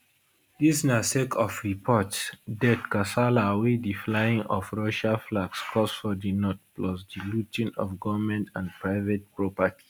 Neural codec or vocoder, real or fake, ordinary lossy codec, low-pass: none; real; none; none